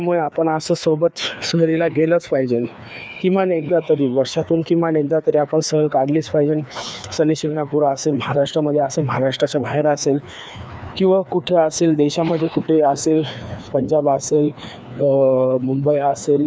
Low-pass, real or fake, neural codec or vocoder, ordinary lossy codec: none; fake; codec, 16 kHz, 2 kbps, FreqCodec, larger model; none